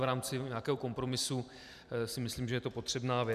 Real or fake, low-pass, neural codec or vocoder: fake; 14.4 kHz; vocoder, 44.1 kHz, 128 mel bands every 256 samples, BigVGAN v2